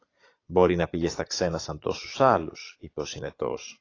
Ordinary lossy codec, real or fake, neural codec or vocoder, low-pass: AAC, 32 kbps; fake; vocoder, 22.05 kHz, 80 mel bands, Vocos; 7.2 kHz